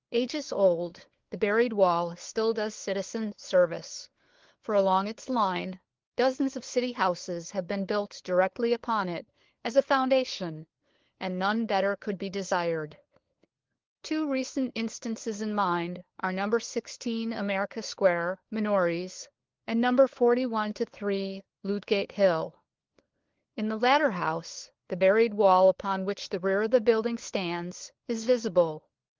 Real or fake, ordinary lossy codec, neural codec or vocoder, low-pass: fake; Opus, 16 kbps; codec, 16 kHz, 4 kbps, FunCodec, trained on LibriTTS, 50 frames a second; 7.2 kHz